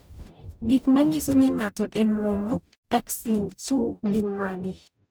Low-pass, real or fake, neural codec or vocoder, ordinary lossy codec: none; fake; codec, 44.1 kHz, 0.9 kbps, DAC; none